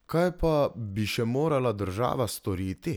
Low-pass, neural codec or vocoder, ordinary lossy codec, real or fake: none; none; none; real